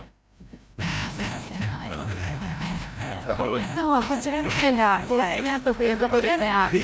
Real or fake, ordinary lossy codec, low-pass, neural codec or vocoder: fake; none; none; codec, 16 kHz, 0.5 kbps, FreqCodec, larger model